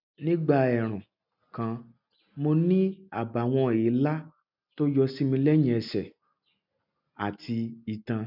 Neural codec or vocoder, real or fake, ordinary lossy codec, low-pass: none; real; none; 5.4 kHz